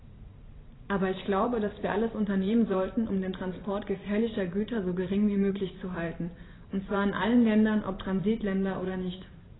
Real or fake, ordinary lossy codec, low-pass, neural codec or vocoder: fake; AAC, 16 kbps; 7.2 kHz; vocoder, 44.1 kHz, 128 mel bands every 512 samples, BigVGAN v2